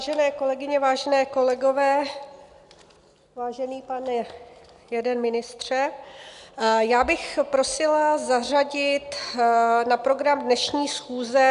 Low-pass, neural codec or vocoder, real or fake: 10.8 kHz; none; real